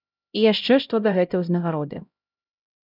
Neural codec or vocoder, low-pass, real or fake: codec, 16 kHz, 0.5 kbps, X-Codec, HuBERT features, trained on LibriSpeech; 5.4 kHz; fake